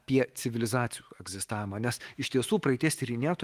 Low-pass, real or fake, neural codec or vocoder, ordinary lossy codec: 14.4 kHz; fake; autoencoder, 48 kHz, 128 numbers a frame, DAC-VAE, trained on Japanese speech; Opus, 24 kbps